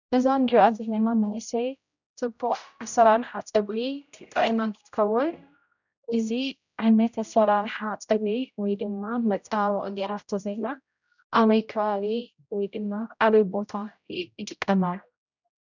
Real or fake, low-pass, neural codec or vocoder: fake; 7.2 kHz; codec, 16 kHz, 0.5 kbps, X-Codec, HuBERT features, trained on general audio